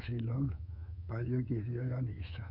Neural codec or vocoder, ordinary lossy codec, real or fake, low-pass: codec, 24 kHz, 3.1 kbps, DualCodec; none; fake; 5.4 kHz